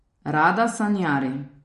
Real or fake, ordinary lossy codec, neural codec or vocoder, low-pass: real; MP3, 48 kbps; none; 10.8 kHz